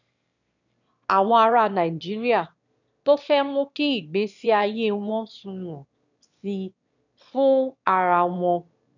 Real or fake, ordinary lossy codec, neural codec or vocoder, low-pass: fake; none; autoencoder, 22.05 kHz, a latent of 192 numbers a frame, VITS, trained on one speaker; 7.2 kHz